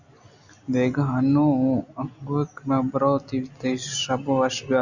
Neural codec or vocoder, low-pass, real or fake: none; 7.2 kHz; real